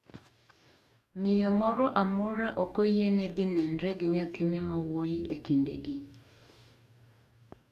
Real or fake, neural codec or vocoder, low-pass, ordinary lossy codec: fake; codec, 44.1 kHz, 2.6 kbps, DAC; 14.4 kHz; none